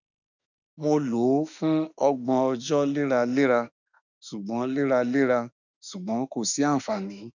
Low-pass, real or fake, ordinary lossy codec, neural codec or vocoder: 7.2 kHz; fake; none; autoencoder, 48 kHz, 32 numbers a frame, DAC-VAE, trained on Japanese speech